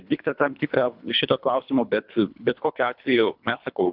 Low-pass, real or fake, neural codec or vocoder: 5.4 kHz; fake; codec, 24 kHz, 3 kbps, HILCodec